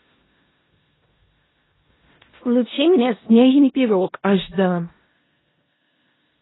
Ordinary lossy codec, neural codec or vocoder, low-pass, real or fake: AAC, 16 kbps; codec, 16 kHz in and 24 kHz out, 0.4 kbps, LongCat-Audio-Codec, four codebook decoder; 7.2 kHz; fake